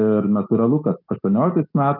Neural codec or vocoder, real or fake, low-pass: none; real; 5.4 kHz